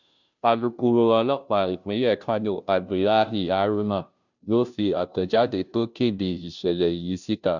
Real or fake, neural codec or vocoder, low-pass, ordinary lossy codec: fake; codec, 16 kHz, 0.5 kbps, FunCodec, trained on Chinese and English, 25 frames a second; 7.2 kHz; none